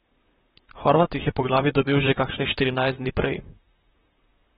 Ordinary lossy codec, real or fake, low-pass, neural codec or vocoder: AAC, 16 kbps; fake; 19.8 kHz; vocoder, 44.1 kHz, 128 mel bands, Pupu-Vocoder